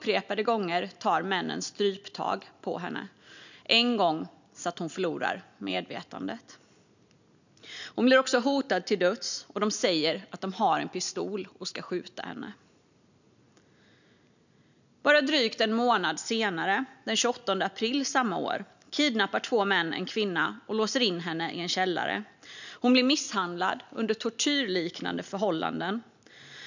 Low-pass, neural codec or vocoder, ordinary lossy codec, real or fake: 7.2 kHz; none; none; real